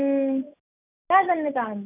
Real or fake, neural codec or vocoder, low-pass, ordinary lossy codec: real; none; 3.6 kHz; none